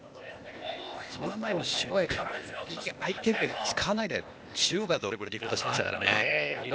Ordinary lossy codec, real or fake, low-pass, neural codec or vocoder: none; fake; none; codec, 16 kHz, 0.8 kbps, ZipCodec